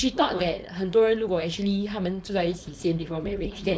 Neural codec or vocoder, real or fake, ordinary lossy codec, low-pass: codec, 16 kHz, 4.8 kbps, FACodec; fake; none; none